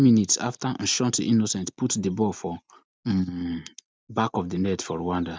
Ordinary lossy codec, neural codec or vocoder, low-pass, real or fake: none; none; none; real